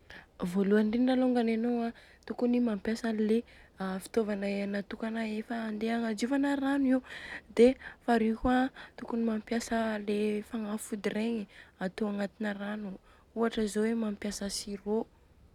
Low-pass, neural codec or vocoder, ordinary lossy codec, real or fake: 19.8 kHz; none; none; real